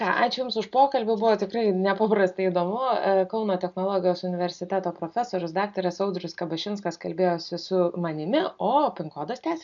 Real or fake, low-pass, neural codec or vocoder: real; 7.2 kHz; none